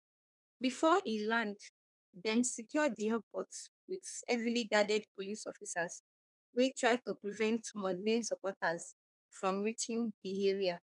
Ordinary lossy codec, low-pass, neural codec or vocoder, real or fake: none; 10.8 kHz; codec, 24 kHz, 1 kbps, SNAC; fake